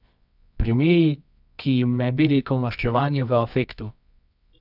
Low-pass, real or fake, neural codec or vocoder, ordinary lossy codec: 5.4 kHz; fake; codec, 24 kHz, 0.9 kbps, WavTokenizer, medium music audio release; none